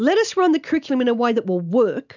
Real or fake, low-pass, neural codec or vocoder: real; 7.2 kHz; none